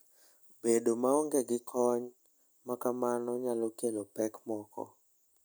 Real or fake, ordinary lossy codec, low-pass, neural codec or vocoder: real; none; none; none